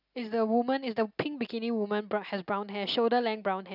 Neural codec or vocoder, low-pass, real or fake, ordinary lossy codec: none; 5.4 kHz; real; none